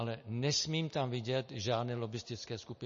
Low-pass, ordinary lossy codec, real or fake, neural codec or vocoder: 7.2 kHz; MP3, 32 kbps; real; none